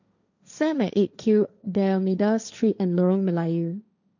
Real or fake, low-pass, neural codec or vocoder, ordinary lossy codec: fake; 7.2 kHz; codec, 16 kHz, 1.1 kbps, Voila-Tokenizer; none